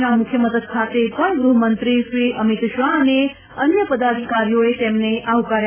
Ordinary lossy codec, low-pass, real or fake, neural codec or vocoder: none; 3.6 kHz; real; none